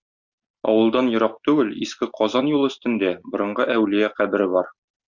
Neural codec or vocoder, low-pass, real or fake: none; 7.2 kHz; real